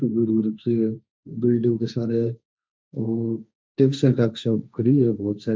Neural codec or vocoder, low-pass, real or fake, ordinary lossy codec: codec, 16 kHz, 1.1 kbps, Voila-Tokenizer; none; fake; none